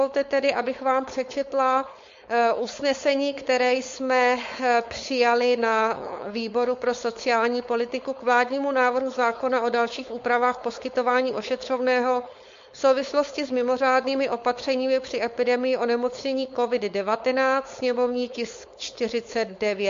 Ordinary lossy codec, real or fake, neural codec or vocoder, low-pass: MP3, 48 kbps; fake; codec, 16 kHz, 4.8 kbps, FACodec; 7.2 kHz